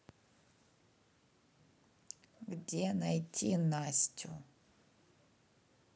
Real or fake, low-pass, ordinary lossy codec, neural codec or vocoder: real; none; none; none